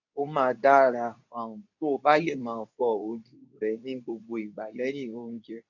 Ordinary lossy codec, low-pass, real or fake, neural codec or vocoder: none; 7.2 kHz; fake; codec, 24 kHz, 0.9 kbps, WavTokenizer, medium speech release version 2